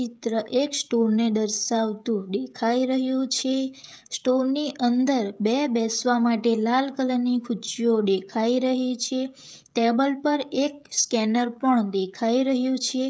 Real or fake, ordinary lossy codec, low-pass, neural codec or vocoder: fake; none; none; codec, 16 kHz, 16 kbps, FreqCodec, smaller model